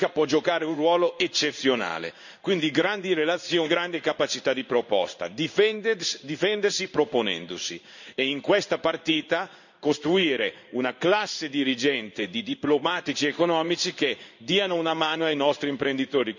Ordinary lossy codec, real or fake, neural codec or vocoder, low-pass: none; fake; codec, 16 kHz in and 24 kHz out, 1 kbps, XY-Tokenizer; 7.2 kHz